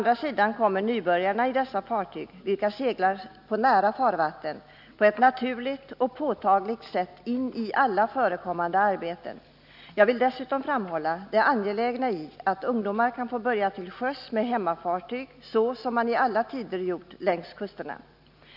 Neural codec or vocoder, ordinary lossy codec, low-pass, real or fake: none; none; 5.4 kHz; real